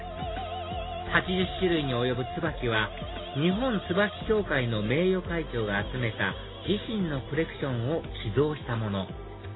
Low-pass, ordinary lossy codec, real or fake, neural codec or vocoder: 7.2 kHz; AAC, 16 kbps; real; none